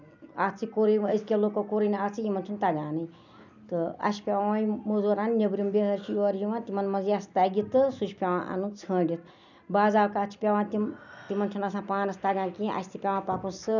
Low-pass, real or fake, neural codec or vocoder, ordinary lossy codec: 7.2 kHz; real; none; none